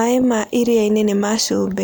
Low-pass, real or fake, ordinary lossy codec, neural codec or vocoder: none; real; none; none